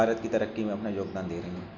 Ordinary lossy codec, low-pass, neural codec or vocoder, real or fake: none; 7.2 kHz; none; real